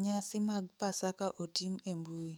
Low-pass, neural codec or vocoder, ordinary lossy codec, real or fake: none; codec, 44.1 kHz, 7.8 kbps, DAC; none; fake